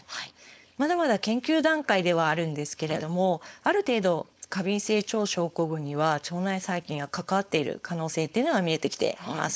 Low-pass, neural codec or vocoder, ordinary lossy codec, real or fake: none; codec, 16 kHz, 4.8 kbps, FACodec; none; fake